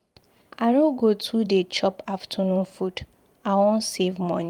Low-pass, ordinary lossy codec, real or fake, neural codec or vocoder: 19.8 kHz; Opus, 32 kbps; fake; vocoder, 44.1 kHz, 128 mel bands every 512 samples, BigVGAN v2